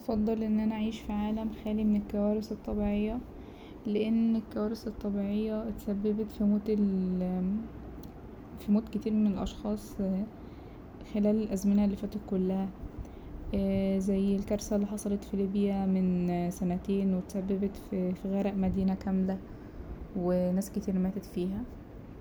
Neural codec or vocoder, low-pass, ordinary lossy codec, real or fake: none; none; none; real